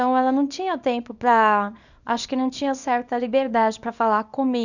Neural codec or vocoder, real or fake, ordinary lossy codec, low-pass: codec, 24 kHz, 0.9 kbps, WavTokenizer, small release; fake; none; 7.2 kHz